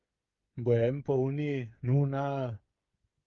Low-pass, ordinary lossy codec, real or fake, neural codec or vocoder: 7.2 kHz; Opus, 32 kbps; fake; codec, 16 kHz, 8 kbps, FreqCodec, smaller model